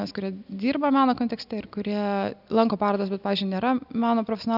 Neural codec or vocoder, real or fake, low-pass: none; real; 5.4 kHz